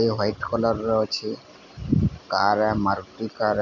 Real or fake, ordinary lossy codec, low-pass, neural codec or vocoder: real; none; 7.2 kHz; none